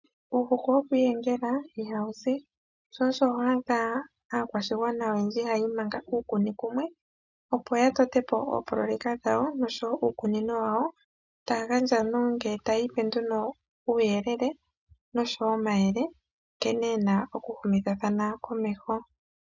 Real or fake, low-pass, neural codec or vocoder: real; 7.2 kHz; none